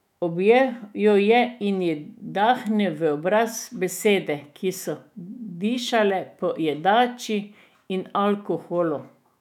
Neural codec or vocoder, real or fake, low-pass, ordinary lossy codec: autoencoder, 48 kHz, 128 numbers a frame, DAC-VAE, trained on Japanese speech; fake; 19.8 kHz; none